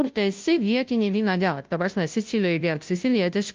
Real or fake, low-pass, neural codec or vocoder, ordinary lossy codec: fake; 7.2 kHz; codec, 16 kHz, 0.5 kbps, FunCodec, trained on Chinese and English, 25 frames a second; Opus, 24 kbps